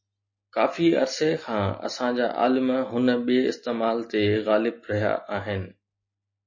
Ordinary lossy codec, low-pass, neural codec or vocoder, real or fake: MP3, 32 kbps; 7.2 kHz; none; real